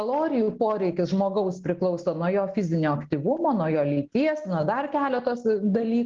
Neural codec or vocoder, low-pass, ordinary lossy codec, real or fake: none; 7.2 kHz; Opus, 16 kbps; real